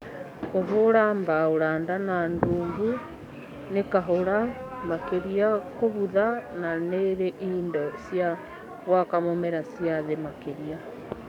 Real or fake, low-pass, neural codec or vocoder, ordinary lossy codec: fake; 19.8 kHz; codec, 44.1 kHz, 7.8 kbps, DAC; none